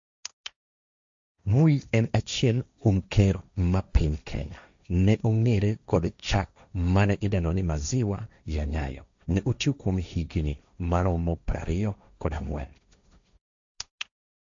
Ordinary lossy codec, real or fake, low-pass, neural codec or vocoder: AAC, 64 kbps; fake; 7.2 kHz; codec, 16 kHz, 1.1 kbps, Voila-Tokenizer